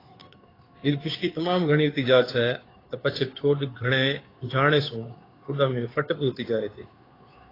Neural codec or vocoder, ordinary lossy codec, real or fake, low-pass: codec, 16 kHz, 2 kbps, FunCodec, trained on Chinese and English, 25 frames a second; AAC, 24 kbps; fake; 5.4 kHz